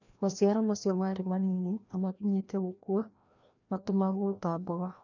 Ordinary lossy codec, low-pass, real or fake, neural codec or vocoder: none; 7.2 kHz; fake; codec, 16 kHz, 1 kbps, FreqCodec, larger model